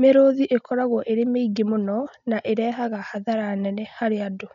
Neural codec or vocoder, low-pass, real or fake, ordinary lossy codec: none; 7.2 kHz; real; none